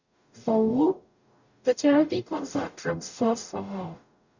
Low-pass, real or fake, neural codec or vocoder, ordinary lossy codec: 7.2 kHz; fake; codec, 44.1 kHz, 0.9 kbps, DAC; none